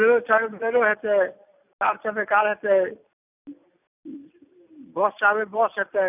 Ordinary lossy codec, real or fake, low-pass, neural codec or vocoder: none; real; 3.6 kHz; none